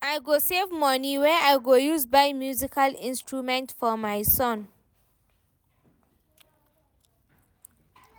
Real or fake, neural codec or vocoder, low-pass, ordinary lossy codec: real; none; none; none